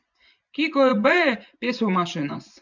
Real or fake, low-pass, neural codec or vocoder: fake; 7.2 kHz; vocoder, 24 kHz, 100 mel bands, Vocos